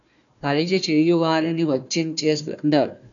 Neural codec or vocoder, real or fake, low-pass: codec, 16 kHz, 1 kbps, FunCodec, trained on Chinese and English, 50 frames a second; fake; 7.2 kHz